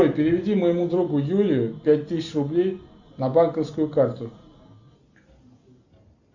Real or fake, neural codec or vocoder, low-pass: real; none; 7.2 kHz